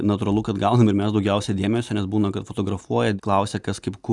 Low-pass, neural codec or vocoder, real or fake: 10.8 kHz; none; real